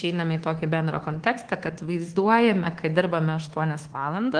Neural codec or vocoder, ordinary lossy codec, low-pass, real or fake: codec, 24 kHz, 1.2 kbps, DualCodec; Opus, 16 kbps; 9.9 kHz; fake